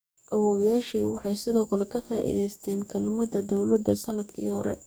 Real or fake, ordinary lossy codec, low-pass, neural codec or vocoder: fake; none; none; codec, 44.1 kHz, 2.6 kbps, DAC